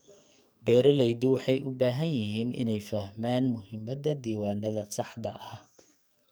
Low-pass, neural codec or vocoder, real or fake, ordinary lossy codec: none; codec, 44.1 kHz, 2.6 kbps, SNAC; fake; none